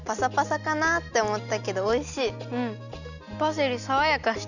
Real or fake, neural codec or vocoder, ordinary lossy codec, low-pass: real; none; none; 7.2 kHz